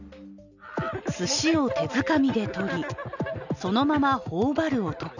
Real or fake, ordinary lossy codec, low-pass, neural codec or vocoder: real; none; 7.2 kHz; none